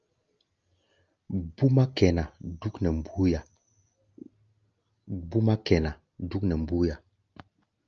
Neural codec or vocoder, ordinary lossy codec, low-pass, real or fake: none; Opus, 24 kbps; 7.2 kHz; real